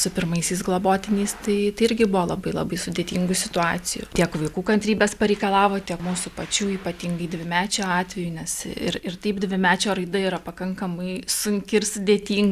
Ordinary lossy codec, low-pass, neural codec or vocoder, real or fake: Opus, 64 kbps; 14.4 kHz; none; real